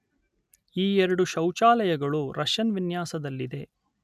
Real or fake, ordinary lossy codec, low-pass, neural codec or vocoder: real; none; 14.4 kHz; none